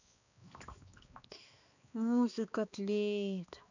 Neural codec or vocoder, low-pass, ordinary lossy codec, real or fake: codec, 16 kHz, 2 kbps, X-Codec, HuBERT features, trained on balanced general audio; 7.2 kHz; none; fake